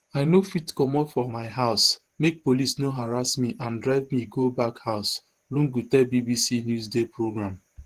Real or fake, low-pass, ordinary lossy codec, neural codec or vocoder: fake; 14.4 kHz; Opus, 16 kbps; vocoder, 48 kHz, 128 mel bands, Vocos